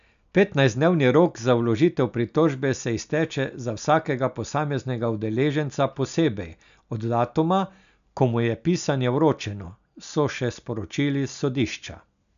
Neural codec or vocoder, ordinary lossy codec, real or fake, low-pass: none; none; real; 7.2 kHz